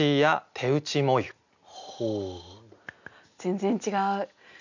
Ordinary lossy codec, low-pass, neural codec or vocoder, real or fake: none; 7.2 kHz; none; real